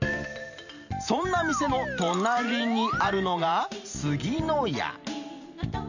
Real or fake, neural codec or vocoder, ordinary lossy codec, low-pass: fake; vocoder, 44.1 kHz, 128 mel bands every 512 samples, BigVGAN v2; none; 7.2 kHz